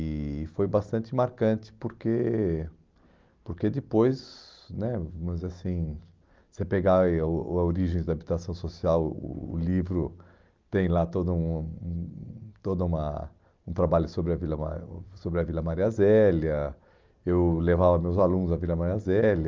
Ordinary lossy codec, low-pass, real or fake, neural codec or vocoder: Opus, 32 kbps; 7.2 kHz; real; none